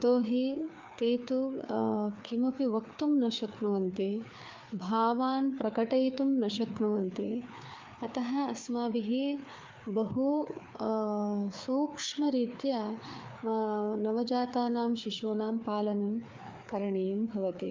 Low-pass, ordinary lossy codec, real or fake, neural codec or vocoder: 7.2 kHz; Opus, 24 kbps; fake; codec, 16 kHz, 4 kbps, FreqCodec, larger model